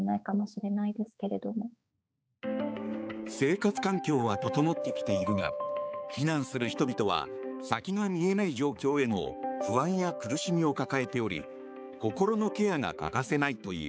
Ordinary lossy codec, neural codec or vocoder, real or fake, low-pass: none; codec, 16 kHz, 4 kbps, X-Codec, HuBERT features, trained on balanced general audio; fake; none